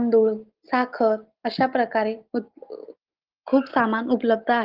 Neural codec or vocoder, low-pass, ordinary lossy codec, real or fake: none; 5.4 kHz; Opus, 16 kbps; real